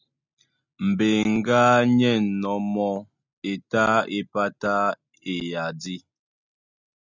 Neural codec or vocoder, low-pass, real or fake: none; 7.2 kHz; real